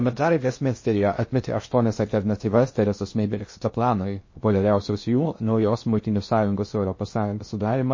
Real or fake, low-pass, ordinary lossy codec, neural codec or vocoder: fake; 7.2 kHz; MP3, 32 kbps; codec, 16 kHz in and 24 kHz out, 0.6 kbps, FocalCodec, streaming, 2048 codes